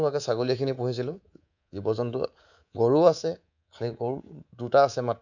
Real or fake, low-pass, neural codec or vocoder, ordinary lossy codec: fake; 7.2 kHz; codec, 24 kHz, 3.1 kbps, DualCodec; none